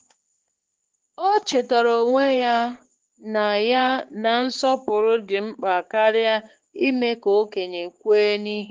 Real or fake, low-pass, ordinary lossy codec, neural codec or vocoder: fake; 7.2 kHz; Opus, 16 kbps; codec, 16 kHz, 4 kbps, X-Codec, HuBERT features, trained on balanced general audio